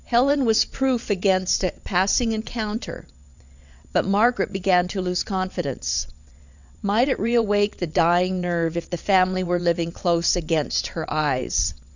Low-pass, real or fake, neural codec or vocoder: 7.2 kHz; fake; vocoder, 22.05 kHz, 80 mel bands, WaveNeXt